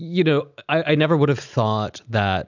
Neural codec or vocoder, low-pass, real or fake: none; 7.2 kHz; real